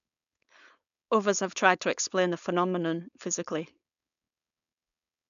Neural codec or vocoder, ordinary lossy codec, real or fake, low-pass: codec, 16 kHz, 4.8 kbps, FACodec; none; fake; 7.2 kHz